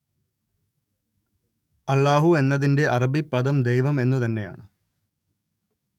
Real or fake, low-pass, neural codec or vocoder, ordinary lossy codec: fake; 19.8 kHz; codec, 44.1 kHz, 7.8 kbps, DAC; none